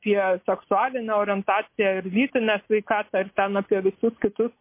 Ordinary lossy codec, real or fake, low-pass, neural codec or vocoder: MP3, 24 kbps; real; 3.6 kHz; none